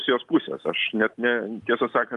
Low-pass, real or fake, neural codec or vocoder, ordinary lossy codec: 10.8 kHz; real; none; Opus, 24 kbps